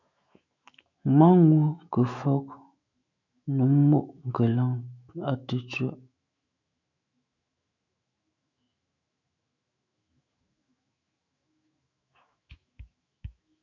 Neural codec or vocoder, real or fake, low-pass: autoencoder, 48 kHz, 128 numbers a frame, DAC-VAE, trained on Japanese speech; fake; 7.2 kHz